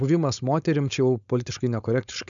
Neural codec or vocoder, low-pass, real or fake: codec, 16 kHz, 4.8 kbps, FACodec; 7.2 kHz; fake